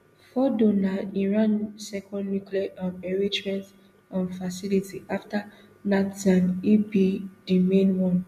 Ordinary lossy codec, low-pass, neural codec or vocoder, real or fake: MP3, 64 kbps; 14.4 kHz; none; real